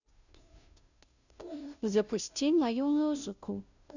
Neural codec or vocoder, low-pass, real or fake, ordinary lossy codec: codec, 16 kHz, 0.5 kbps, FunCodec, trained on Chinese and English, 25 frames a second; 7.2 kHz; fake; none